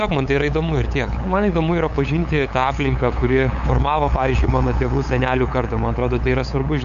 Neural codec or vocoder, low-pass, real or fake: codec, 16 kHz, 8 kbps, FunCodec, trained on LibriTTS, 25 frames a second; 7.2 kHz; fake